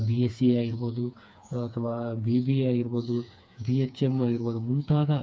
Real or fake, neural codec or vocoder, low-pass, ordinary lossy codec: fake; codec, 16 kHz, 4 kbps, FreqCodec, smaller model; none; none